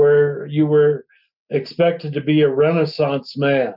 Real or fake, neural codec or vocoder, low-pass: real; none; 5.4 kHz